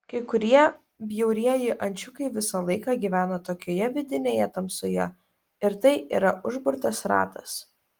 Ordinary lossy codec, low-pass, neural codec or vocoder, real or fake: Opus, 24 kbps; 19.8 kHz; none; real